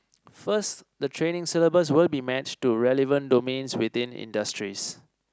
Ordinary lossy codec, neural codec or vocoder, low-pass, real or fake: none; none; none; real